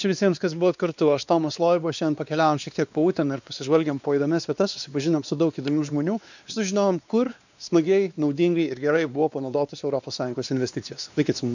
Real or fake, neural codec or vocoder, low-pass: fake; codec, 16 kHz, 2 kbps, X-Codec, WavLM features, trained on Multilingual LibriSpeech; 7.2 kHz